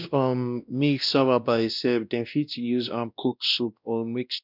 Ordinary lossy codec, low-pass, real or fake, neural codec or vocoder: none; 5.4 kHz; fake; codec, 16 kHz, 1 kbps, X-Codec, WavLM features, trained on Multilingual LibriSpeech